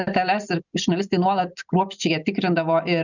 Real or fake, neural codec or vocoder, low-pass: real; none; 7.2 kHz